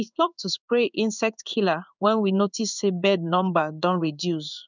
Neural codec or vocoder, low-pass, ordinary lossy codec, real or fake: codec, 16 kHz in and 24 kHz out, 1 kbps, XY-Tokenizer; 7.2 kHz; none; fake